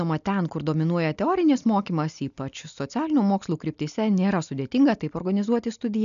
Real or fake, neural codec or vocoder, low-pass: real; none; 7.2 kHz